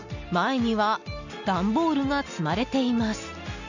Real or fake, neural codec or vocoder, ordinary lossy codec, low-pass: real; none; none; 7.2 kHz